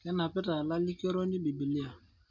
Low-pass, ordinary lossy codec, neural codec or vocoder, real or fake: 7.2 kHz; MP3, 48 kbps; none; real